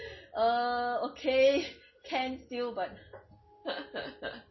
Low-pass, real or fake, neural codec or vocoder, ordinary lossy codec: 7.2 kHz; real; none; MP3, 24 kbps